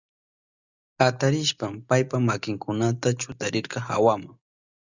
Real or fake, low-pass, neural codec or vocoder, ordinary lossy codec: real; 7.2 kHz; none; Opus, 64 kbps